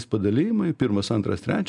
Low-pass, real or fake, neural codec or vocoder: 10.8 kHz; real; none